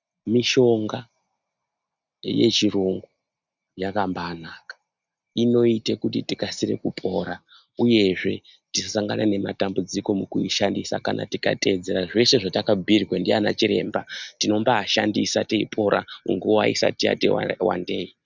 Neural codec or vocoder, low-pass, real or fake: none; 7.2 kHz; real